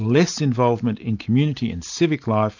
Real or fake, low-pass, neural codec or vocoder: real; 7.2 kHz; none